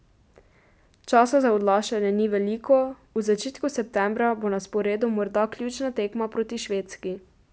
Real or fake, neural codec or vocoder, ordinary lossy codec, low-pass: real; none; none; none